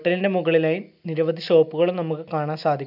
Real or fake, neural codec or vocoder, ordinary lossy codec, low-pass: real; none; none; 5.4 kHz